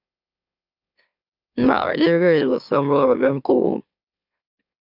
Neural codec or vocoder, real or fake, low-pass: autoencoder, 44.1 kHz, a latent of 192 numbers a frame, MeloTTS; fake; 5.4 kHz